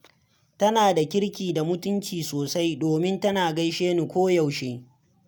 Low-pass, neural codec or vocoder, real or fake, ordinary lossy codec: none; none; real; none